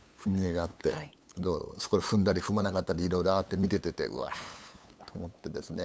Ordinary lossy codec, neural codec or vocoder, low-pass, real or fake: none; codec, 16 kHz, 8 kbps, FunCodec, trained on LibriTTS, 25 frames a second; none; fake